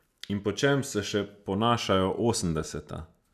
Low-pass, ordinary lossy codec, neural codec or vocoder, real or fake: 14.4 kHz; none; none; real